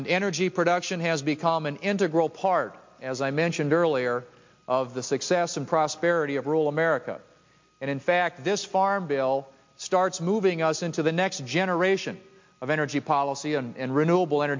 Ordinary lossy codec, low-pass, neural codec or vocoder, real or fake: MP3, 48 kbps; 7.2 kHz; none; real